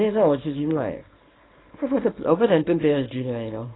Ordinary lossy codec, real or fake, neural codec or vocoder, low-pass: AAC, 16 kbps; fake; codec, 24 kHz, 0.9 kbps, WavTokenizer, small release; 7.2 kHz